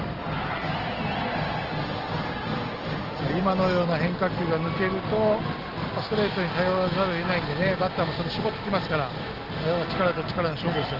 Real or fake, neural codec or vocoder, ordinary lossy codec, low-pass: real; none; Opus, 16 kbps; 5.4 kHz